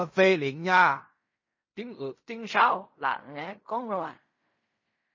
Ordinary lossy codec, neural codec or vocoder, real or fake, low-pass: MP3, 32 kbps; codec, 16 kHz in and 24 kHz out, 0.4 kbps, LongCat-Audio-Codec, fine tuned four codebook decoder; fake; 7.2 kHz